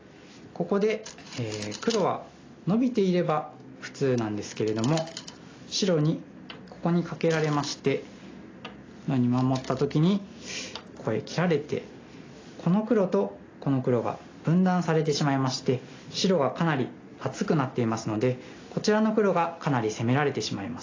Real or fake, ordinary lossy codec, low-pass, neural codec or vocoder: real; AAC, 32 kbps; 7.2 kHz; none